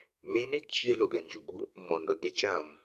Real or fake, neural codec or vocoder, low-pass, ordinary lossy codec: fake; codec, 44.1 kHz, 2.6 kbps, SNAC; 14.4 kHz; MP3, 96 kbps